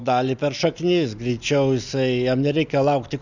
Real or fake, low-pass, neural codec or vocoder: real; 7.2 kHz; none